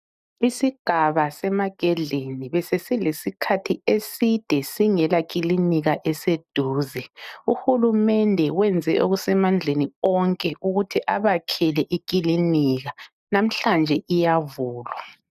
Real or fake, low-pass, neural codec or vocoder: real; 14.4 kHz; none